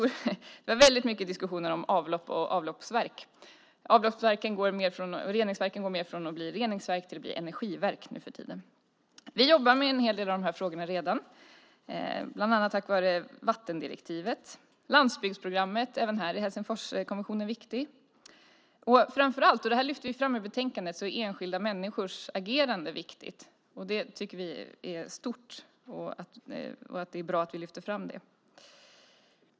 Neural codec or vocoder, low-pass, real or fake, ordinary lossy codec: none; none; real; none